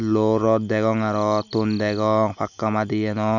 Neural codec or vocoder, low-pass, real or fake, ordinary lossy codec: none; 7.2 kHz; real; none